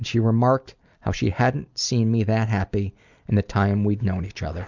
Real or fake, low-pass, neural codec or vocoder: real; 7.2 kHz; none